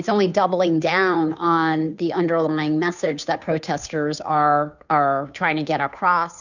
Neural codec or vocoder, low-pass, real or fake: codec, 16 kHz, 2 kbps, FunCodec, trained on Chinese and English, 25 frames a second; 7.2 kHz; fake